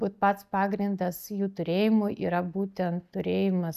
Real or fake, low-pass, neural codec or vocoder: real; 14.4 kHz; none